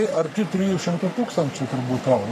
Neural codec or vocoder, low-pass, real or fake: codec, 44.1 kHz, 3.4 kbps, Pupu-Codec; 14.4 kHz; fake